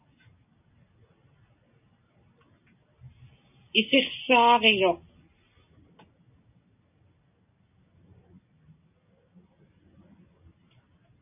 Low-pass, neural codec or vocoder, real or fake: 3.6 kHz; none; real